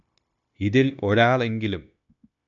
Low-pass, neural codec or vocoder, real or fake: 7.2 kHz; codec, 16 kHz, 0.9 kbps, LongCat-Audio-Codec; fake